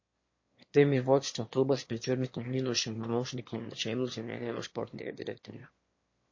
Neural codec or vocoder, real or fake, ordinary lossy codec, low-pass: autoencoder, 22.05 kHz, a latent of 192 numbers a frame, VITS, trained on one speaker; fake; MP3, 32 kbps; 7.2 kHz